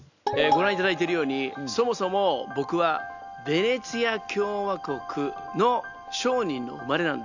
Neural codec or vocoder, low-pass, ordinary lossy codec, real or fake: none; 7.2 kHz; none; real